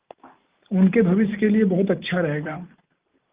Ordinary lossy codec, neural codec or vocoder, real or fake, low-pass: Opus, 32 kbps; none; real; 3.6 kHz